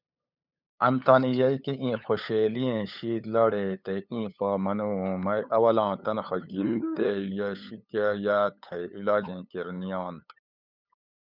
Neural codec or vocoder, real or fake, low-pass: codec, 16 kHz, 8 kbps, FunCodec, trained on LibriTTS, 25 frames a second; fake; 5.4 kHz